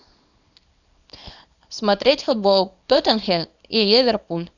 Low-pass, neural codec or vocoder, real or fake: 7.2 kHz; codec, 24 kHz, 0.9 kbps, WavTokenizer, small release; fake